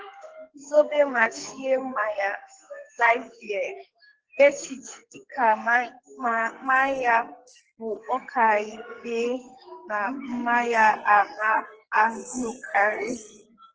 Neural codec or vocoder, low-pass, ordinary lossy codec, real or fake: codec, 44.1 kHz, 2.6 kbps, SNAC; 7.2 kHz; Opus, 16 kbps; fake